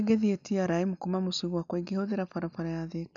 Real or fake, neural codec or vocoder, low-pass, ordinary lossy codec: real; none; 7.2 kHz; none